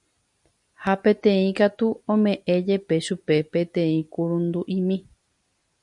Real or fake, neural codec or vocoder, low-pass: real; none; 10.8 kHz